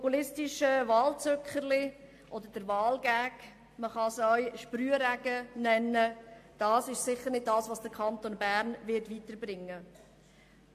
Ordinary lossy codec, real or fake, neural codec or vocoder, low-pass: AAC, 64 kbps; real; none; 14.4 kHz